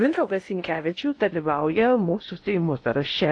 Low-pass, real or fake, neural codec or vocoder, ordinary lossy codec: 9.9 kHz; fake; codec, 16 kHz in and 24 kHz out, 0.6 kbps, FocalCodec, streaming, 2048 codes; AAC, 48 kbps